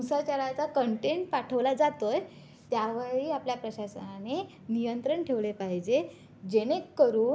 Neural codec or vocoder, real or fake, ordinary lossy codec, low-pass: none; real; none; none